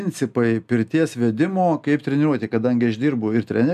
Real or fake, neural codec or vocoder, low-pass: real; none; 14.4 kHz